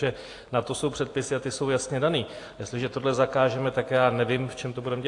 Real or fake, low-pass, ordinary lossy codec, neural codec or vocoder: real; 10.8 kHz; AAC, 48 kbps; none